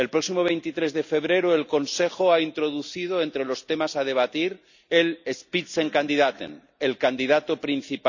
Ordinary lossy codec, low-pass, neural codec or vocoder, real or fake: none; 7.2 kHz; none; real